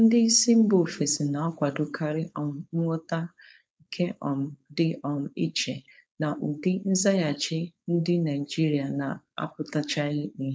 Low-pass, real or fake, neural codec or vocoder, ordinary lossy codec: none; fake; codec, 16 kHz, 4.8 kbps, FACodec; none